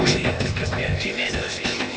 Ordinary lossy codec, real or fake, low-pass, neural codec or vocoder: none; fake; none; codec, 16 kHz, 0.8 kbps, ZipCodec